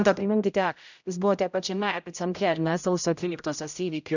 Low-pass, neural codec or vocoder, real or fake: 7.2 kHz; codec, 16 kHz, 0.5 kbps, X-Codec, HuBERT features, trained on general audio; fake